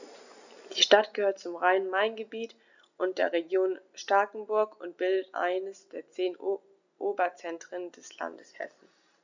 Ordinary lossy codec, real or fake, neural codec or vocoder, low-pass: none; real; none; 7.2 kHz